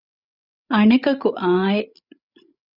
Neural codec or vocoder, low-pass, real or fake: codec, 16 kHz, 16 kbps, FreqCodec, larger model; 5.4 kHz; fake